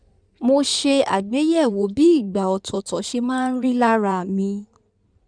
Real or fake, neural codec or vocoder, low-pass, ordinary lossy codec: fake; codec, 16 kHz in and 24 kHz out, 2.2 kbps, FireRedTTS-2 codec; 9.9 kHz; none